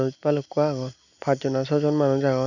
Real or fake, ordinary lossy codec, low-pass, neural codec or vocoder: real; none; 7.2 kHz; none